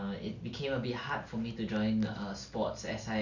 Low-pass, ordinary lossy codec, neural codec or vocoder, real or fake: 7.2 kHz; none; none; real